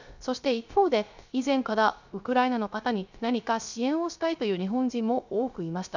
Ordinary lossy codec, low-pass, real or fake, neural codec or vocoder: none; 7.2 kHz; fake; codec, 16 kHz, 0.3 kbps, FocalCodec